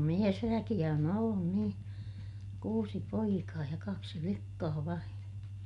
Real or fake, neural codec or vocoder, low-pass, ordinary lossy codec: real; none; 10.8 kHz; none